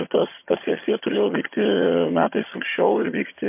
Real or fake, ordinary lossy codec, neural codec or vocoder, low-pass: fake; MP3, 24 kbps; vocoder, 22.05 kHz, 80 mel bands, HiFi-GAN; 3.6 kHz